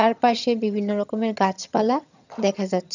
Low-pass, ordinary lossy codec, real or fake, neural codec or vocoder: 7.2 kHz; none; fake; vocoder, 22.05 kHz, 80 mel bands, HiFi-GAN